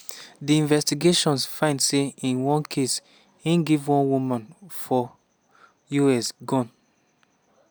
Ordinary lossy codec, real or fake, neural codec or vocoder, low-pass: none; real; none; none